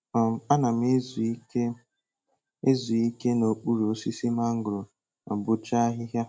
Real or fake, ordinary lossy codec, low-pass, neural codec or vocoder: real; none; none; none